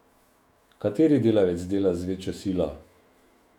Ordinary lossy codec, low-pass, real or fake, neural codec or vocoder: none; 19.8 kHz; fake; autoencoder, 48 kHz, 128 numbers a frame, DAC-VAE, trained on Japanese speech